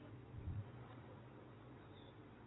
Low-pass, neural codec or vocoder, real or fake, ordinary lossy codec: 7.2 kHz; vocoder, 22.05 kHz, 80 mel bands, Vocos; fake; AAC, 16 kbps